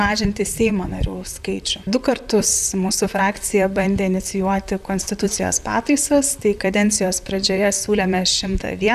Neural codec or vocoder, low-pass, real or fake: vocoder, 44.1 kHz, 128 mel bands, Pupu-Vocoder; 14.4 kHz; fake